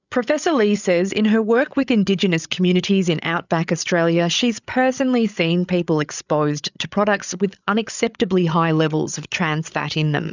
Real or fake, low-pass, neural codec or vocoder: fake; 7.2 kHz; codec, 16 kHz, 8 kbps, FreqCodec, larger model